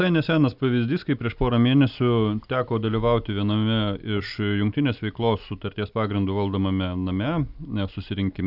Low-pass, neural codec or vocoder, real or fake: 5.4 kHz; none; real